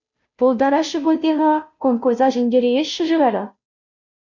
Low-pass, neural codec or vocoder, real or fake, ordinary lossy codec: 7.2 kHz; codec, 16 kHz, 0.5 kbps, FunCodec, trained on Chinese and English, 25 frames a second; fake; MP3, 64 kbps